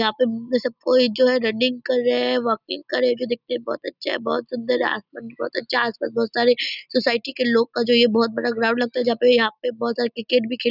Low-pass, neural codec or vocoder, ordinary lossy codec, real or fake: 5.4 kHz; none; none; real